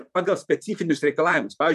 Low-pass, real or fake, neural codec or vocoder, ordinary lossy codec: 14.4 kHz; fake; vocoder, 44.1 kHz, 128 mel bands, Pupu-Vocoder; MP3, 96 kbps